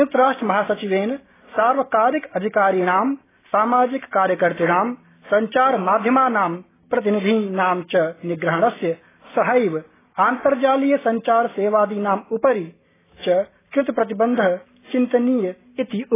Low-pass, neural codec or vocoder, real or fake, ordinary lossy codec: 3.6 kHz; none; real; AAC, 16 kbps